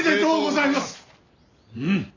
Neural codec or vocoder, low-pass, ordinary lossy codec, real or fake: none; 7.2 kHz; AAC, 48 kbps; real